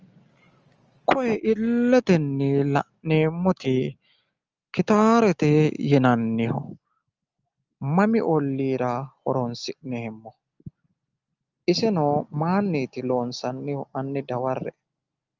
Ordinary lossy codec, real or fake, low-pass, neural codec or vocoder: Opus, 24 kbps; real; 7.2 kHz; none